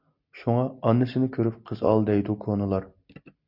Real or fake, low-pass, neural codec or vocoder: real; 5.4 kHz; none